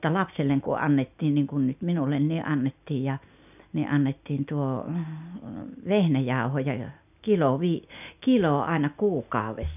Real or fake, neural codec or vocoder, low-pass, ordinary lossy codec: real; none; 3.6 kHz; none